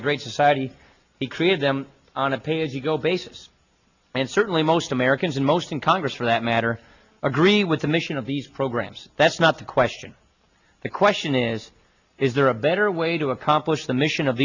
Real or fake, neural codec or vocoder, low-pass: real; none; 7.2 kHz